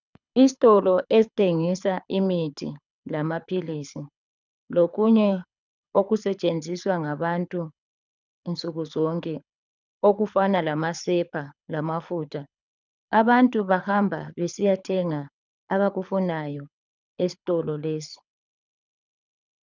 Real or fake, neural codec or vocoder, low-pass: fake; codec, 24 kHz, 6 kbps, HILCodec; 7.2 kHz